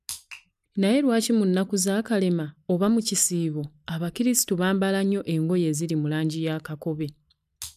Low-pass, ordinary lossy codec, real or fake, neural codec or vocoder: 14.4 kHz; none; real; none